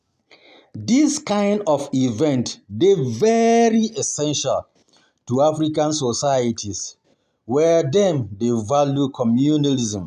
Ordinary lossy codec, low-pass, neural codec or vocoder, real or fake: none; 14.4 kHz; none; real